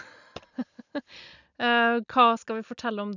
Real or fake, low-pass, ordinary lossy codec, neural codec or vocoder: real; 7.2 kHz; none; none